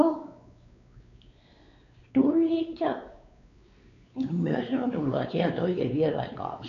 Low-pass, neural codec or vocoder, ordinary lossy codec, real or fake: 7.2 kHz; codec, 16 kHz, 4 kbps, X-Codec, WavLM features, trained on Multilingual LibriSpeech; none; fake